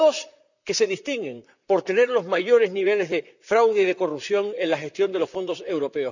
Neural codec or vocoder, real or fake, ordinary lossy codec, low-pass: vocoder, 44.1 kHz, 128 mel bands, Pupu-Vocoder; fake; none; 7.2 kHz